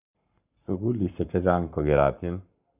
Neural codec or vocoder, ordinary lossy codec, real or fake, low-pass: codec, 44.1 kHz, 7.8 kbps, Pupu-Codec; none; fake; 3.6 kHz